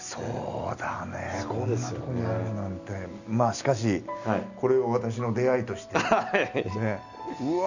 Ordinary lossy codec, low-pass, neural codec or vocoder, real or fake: none; 7.2 kHz; none; real